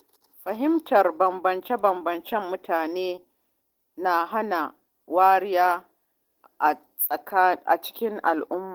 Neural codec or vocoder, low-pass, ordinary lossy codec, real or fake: none; 19.8 kHz; Opus, 16 kbps; real